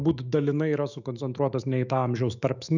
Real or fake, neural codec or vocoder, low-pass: fake; autoencoder, 48 kHz, 128 numbers a frame, DAC-VAE, trained on Japanese speech; 7.2 kHz